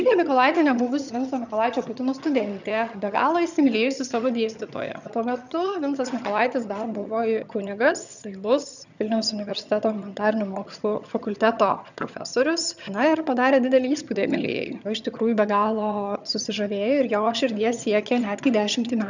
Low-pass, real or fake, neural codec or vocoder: 7.2 kHz; fake; vocoder, 22.05 kHz, 80 mel bands, HiFi-GAN